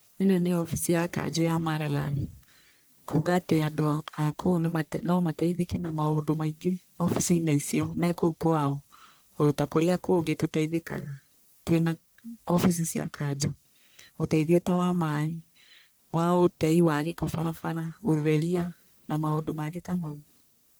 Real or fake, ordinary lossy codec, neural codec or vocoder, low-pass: fake; none; codec, 44.1 kHz, 1.7 kbps, Pupu-Codec; none